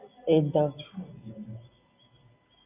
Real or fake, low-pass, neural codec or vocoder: real; 3.6 kHz; none